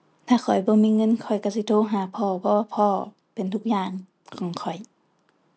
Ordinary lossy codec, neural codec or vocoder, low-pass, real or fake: none; none; none; real